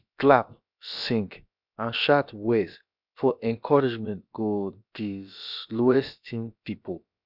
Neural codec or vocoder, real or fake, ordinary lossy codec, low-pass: codec, 16 kHz, about 1 kbps, DyCAST, with the encoder's durations; fake; none; 5.4 kHz